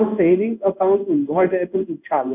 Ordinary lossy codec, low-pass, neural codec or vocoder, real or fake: none; 3.6 kHz; codec, 16 kHz in and 24 kHz out, 1 kbps, XY-Tokenizer; fake